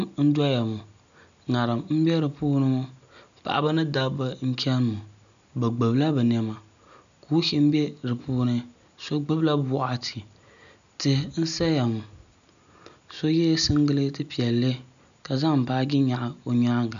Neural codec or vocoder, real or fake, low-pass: none; real; 7.2 kHz